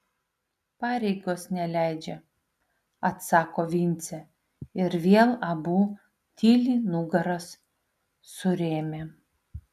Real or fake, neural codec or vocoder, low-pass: real; none; 14.4 kHz